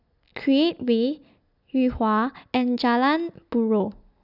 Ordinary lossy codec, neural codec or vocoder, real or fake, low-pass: none; none; real; 5.4 kHz